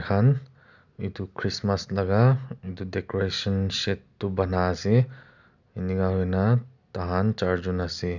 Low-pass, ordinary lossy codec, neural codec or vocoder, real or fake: 7.2 kHz; none; none; real